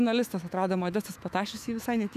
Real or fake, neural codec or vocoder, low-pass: fake; autoencoder, 48 kHz, 128 numbers a frame, DAC-VAE, trained on Japanese speech; 14.4 kHz